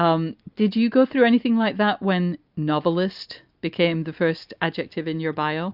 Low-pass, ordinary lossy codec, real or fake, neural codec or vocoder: 5.4 kHz; Opus, 64 kbps; real; none